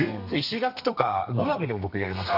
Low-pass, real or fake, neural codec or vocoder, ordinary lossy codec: 5.4 kHz; fake; codec, 32 kHz, 1.9 kbps, SNAC; none